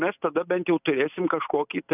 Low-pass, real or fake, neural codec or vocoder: 3.6 kHz; real; none